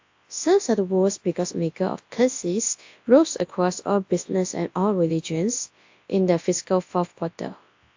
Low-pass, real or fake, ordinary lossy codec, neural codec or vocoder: 7.2 kHz; fake; AAC, 48 kbps; codec, 24 kHz, 0.9 kbps, WavTokenizer, large speech release